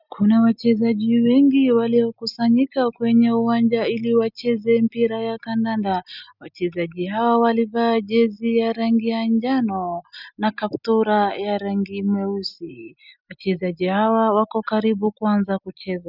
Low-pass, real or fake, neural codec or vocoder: 5.4 kHz; real; none